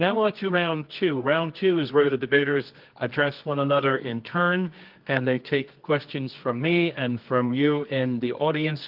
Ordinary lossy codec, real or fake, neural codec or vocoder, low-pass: Opus, 32 kbps; fake; codec, 24 kHz, 0.9 kbps, WavTokenizer, medium music audio release; 5.4 kHz